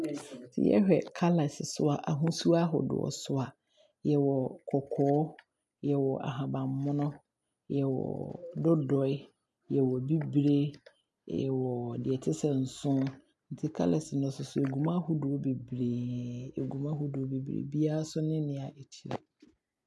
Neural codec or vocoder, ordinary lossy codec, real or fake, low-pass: none; none; real; none